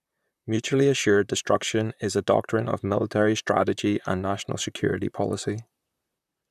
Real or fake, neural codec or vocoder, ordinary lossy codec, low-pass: fake; vocoder, 44.1 kHz, 128 mel bands, Pupu-Vocoder; none; 14.4 kHz